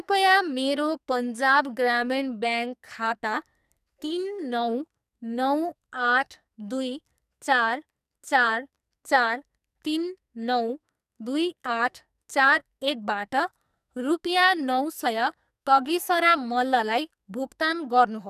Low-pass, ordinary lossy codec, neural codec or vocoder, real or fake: 14.4 kHz; none; codec, 44.1 kHz, 2.6 kbps, SNAC; fake